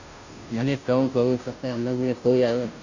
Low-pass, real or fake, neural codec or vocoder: 7.2 kHz; fake; codec, 16 kHz, 0.5 kbps, FunCodec, trained on Chinese and English, 25 frames a second